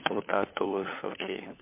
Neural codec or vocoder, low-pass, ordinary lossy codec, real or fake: codec, 16 kHz, 4 kbps, FunCodec, trained on LibriTTS, 50 frames a second; 3.6 kHz; MP3, 24 kbps; fake